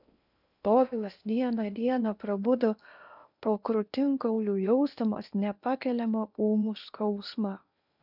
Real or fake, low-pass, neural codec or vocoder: fake; 5.4 kHz; codec, 16 kHz in and 24 kHz out, 0.8 kbps, FocalCodec, streaming, 65536 codes